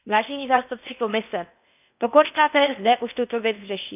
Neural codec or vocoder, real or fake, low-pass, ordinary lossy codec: codec, 16 kHz in and 24 kHz out, 0.8 kbps, FocalCodec, streaming, 65536 codes; fake; 3.6 kHz; none